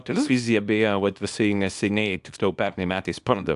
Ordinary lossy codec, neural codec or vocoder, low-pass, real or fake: AAC, 96 kbps; codec, 24 kHz, 0.9 kbps, WavTokenizer, small release; 10.8 kHz; fake